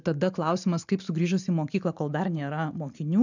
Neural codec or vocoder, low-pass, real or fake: none; 7.2 kHz; real